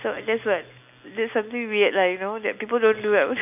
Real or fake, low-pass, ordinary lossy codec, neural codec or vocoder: real; 3.6 kHz; none; none